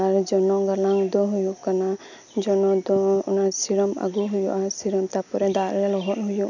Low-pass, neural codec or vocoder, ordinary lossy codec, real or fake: 7.2 kHz; none; none; real